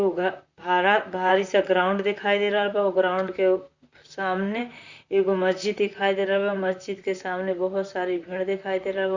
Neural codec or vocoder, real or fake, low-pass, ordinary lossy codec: vocoder, 44.1 kHz, 128 mel bands, Pupu-Vocoder; fake; 7.2 kHz; Opus, 64 kbps